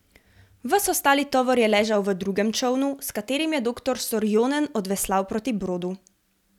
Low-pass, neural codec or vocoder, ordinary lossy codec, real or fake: 19.8 kHz; none; none; real